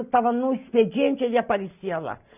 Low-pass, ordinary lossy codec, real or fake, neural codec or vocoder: 3.6 kHz; none; real; none